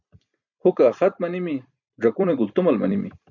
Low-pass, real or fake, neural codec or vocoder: 7.2 kHz; fake; vocoder, 44.1 kHz, 128 mel bands every 256 samples, BigVGAN v2